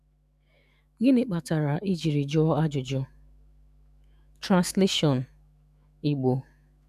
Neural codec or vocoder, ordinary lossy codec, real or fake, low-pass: autoencoder, 48 kHz, 128 numbers a frame, DAC-VAE, trained on Japanese speech; none; fake; 14.4 kHz